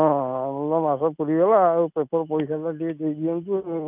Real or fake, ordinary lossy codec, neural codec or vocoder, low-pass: real; none; none; 3.6 kHz